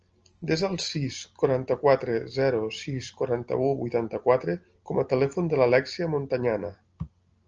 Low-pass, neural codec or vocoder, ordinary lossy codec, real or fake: 7.2 kHz; none; Opus, 24 kbps; real